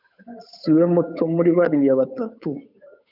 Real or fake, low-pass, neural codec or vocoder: fake; 5.4 kHz; codec, 16 kHz, 8 kbps, FunCodec, trained on Chinese and English, 25 frames a second